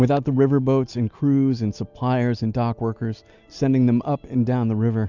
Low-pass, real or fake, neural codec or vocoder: 7.2 kHz; real; none